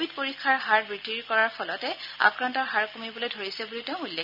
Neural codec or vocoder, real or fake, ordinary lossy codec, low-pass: none; real; none; 5.4 kHz